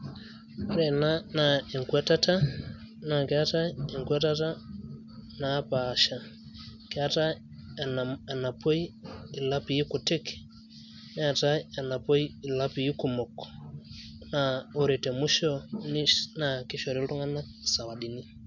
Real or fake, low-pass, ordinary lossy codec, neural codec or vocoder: real; 7.2 kHz; none; none